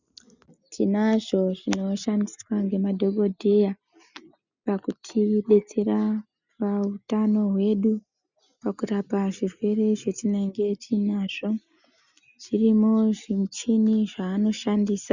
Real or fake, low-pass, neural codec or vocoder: real; 7.2 kHz; none